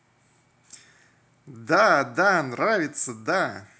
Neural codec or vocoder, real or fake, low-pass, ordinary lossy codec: none; real; none; none